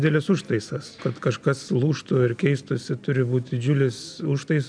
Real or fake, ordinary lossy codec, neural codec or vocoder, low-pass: real; MP3, 96 kbps; none; 9.9 kHz